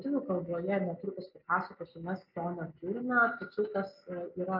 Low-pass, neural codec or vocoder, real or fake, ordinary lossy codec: 5.4 kHz; none; real; MP3, 48 kbps